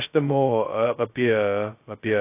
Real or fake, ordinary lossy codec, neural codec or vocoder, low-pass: fake; AAC, 24 kbps; codec, 16 kHz, 0.2 kbps, FocalCodec; 3.6 kHz